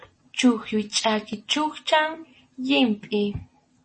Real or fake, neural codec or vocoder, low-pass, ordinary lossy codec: real; none; 10.8 kHz; MP3, 32 kbps